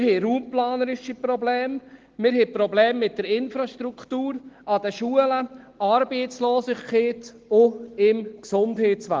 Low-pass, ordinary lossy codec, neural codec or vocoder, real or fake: 7.2 kHz; Opus, 16 kbps; none; real